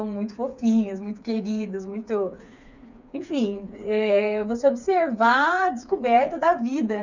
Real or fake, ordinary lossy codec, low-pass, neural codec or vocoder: fake; none; 7.2 kHz; codec, 16 kHz, 4 kbps, FreqCodec, smaller model